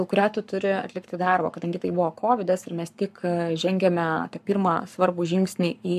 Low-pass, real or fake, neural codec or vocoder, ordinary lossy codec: 14.4 kHz; fake; codec, 44.1 kHz, 7.8 kbps, Pupu-Codec; AAC, 96 kbps